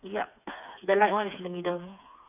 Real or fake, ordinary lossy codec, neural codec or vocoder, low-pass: fake; none; codec, 16 kHz, 4 kbps, FreqCodec, smaller model; 3.6 kHz